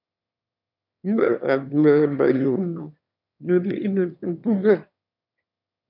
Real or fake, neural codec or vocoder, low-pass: fake; autoencoder, 22.05 kHz, a latent of 192 numbers a frame, VITS, trained on one speaker; 5.4 kHz